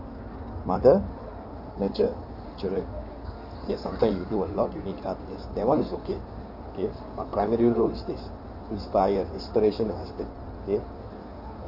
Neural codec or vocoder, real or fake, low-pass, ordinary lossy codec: codec, 16 kHz in and 24 kHz out, 2.2 kbps, FireRedTTS-2 codec; fake; 5.4 kHz; none